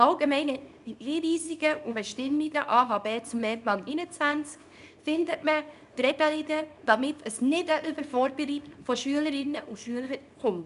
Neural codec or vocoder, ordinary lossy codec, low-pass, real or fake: codec, 24 kHz, 0.9 kbps, WavTokenizer, small release; none; 10.8 kHz; fake